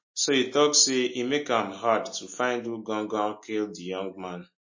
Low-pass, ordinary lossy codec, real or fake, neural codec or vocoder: 7.2 kHz; MP3, 32 kbps; real; none